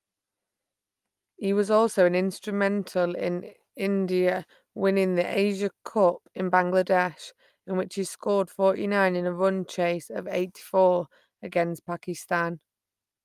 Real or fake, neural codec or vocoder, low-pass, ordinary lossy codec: real; none; 14.4 kHz; Opus, 32 kbps